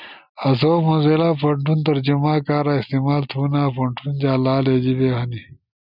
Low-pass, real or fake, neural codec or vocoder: 5.4 kHz; real; none